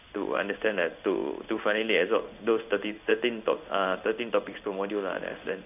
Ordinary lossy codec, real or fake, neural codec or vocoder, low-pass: none; fake; codec, 16 kHz in and 24 kHz out, 1 kbps, XY-Tokenizer; 3.6 kHz